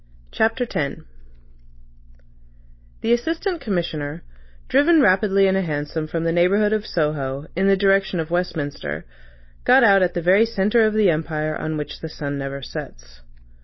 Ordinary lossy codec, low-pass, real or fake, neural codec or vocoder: MP3, 24 kbps; 7.2 kHz; real; none